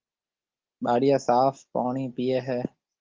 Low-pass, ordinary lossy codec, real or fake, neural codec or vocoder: 7.2 kHz; Opus, 16 kbps; real; none